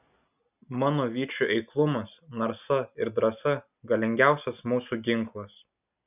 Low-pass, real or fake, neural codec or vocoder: 3.6 kHz; real; none